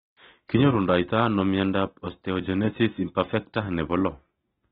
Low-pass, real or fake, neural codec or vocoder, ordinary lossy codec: 7.2 kHz; real; none; AAC, 16 kbps